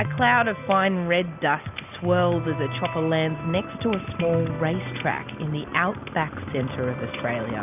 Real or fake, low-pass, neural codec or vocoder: real; 3.6 kHz; none